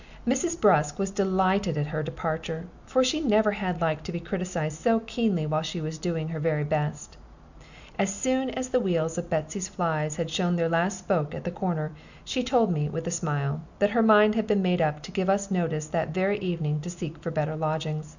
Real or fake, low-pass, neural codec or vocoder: real; 7.2 kHz; none